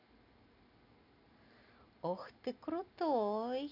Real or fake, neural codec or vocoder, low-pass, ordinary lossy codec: real; none; 5.4 kHz; none